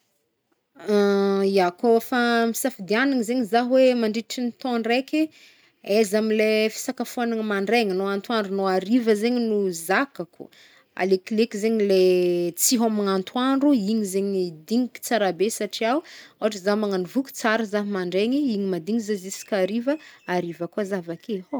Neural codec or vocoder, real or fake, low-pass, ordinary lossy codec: none; real; none; none